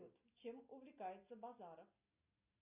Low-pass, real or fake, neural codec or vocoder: 3.6 kHz; real; none